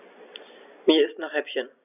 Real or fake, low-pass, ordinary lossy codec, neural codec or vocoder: real; 3.6 kHz; none; none